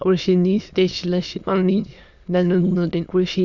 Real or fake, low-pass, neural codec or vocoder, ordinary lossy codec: fake; 7.2 kHz; autoencoder, 22.05 kHz, a latent of 192 numbers a frame, VITS, trained on many speakers; Opus, 64 kbps